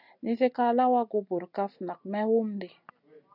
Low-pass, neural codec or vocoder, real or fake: 5.4 kHz; none; real